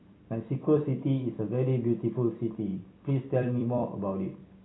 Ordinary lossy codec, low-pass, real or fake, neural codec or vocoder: AAC, 16 kbps; 7.2 kHz; fake; vocoder, 44.1 kHz, 128 mel bands every 256 samples, BigVGAN v2